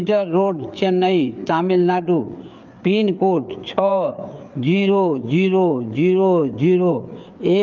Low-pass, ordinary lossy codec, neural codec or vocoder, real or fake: 7.2 kHz; Opus, 24 kbps; codec, 16 kHz, 4 kbps, FreqCodec, larger model; fake